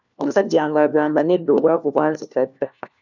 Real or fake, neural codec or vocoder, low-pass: fake; codec, 16 kHz, 1 kbps, FunCodec, trained on LibriTTS, 50 frames a second; 7.2 kHz